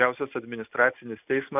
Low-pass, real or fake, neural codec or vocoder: 3.6 kHz; real; none